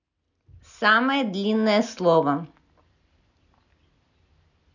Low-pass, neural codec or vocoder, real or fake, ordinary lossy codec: 7.2 kHz; none; real; none